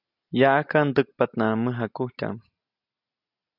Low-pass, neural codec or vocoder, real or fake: 5.4 kHz; none; real